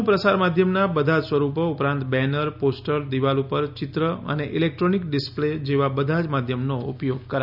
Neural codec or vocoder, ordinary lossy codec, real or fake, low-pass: none; none; real; 5.4 kHz